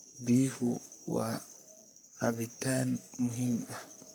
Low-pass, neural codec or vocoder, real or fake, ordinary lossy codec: none; codec, 44.1 kHz, 3.4 kbps, Pupu-Codec; fake; none